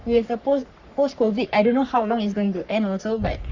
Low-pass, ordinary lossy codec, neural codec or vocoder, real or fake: 7.2 kHz; Opus, 64 kbps; codec, 44.1 kHz, 3.4 kbps, Pupu-Codec; fake